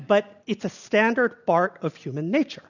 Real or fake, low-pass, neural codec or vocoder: real; 7.2 kHz; none